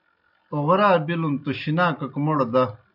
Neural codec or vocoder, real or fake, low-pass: none; real; 5.4 kHz